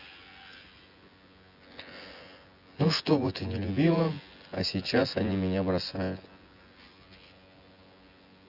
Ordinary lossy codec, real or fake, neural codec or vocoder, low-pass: Opus, 64 kbps; fake; vocoder, 24 kHz, 100 mel bands, Vocos; 5.4 kHz